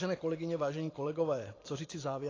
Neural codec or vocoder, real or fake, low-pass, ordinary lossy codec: vocoder, 44.1 kHz, 128 mel bands every 512 samples, BigVGAN v2; fake; 7.2 kHz; AAC, 32 kbps